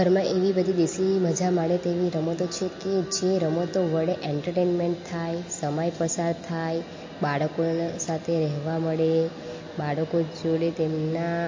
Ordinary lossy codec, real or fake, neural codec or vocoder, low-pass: MP3, 32 kbps; real; none; 7.2 kHz